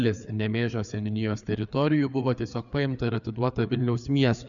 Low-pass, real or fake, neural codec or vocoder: 7.2 kHz; fake; codec, 16 kHz, 4 kbps, FreqCodec, larger model